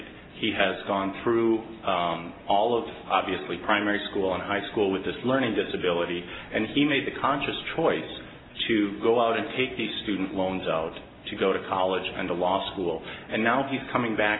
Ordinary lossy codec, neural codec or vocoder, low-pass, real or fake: AAC, 16 kbps; none; 7.2 kHz; real